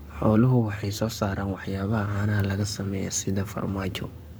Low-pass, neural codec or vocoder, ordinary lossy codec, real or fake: none; codec, 44.1 kHz, 7.8 kbps, Pupu-Codec; none; fake